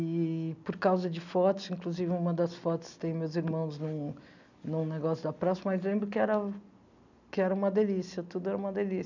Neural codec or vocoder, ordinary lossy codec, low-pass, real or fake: none; none; 7.2 kHz; real